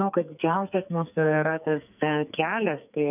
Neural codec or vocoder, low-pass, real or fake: codec, 44.1 kHz, 2.6 kbps, SNAC; 3.6 kHz; fake